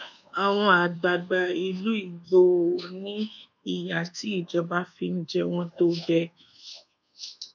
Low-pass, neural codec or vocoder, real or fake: 7.2 kHz; codec, 24 kHz, 1.2 kbps, DualCodec; fake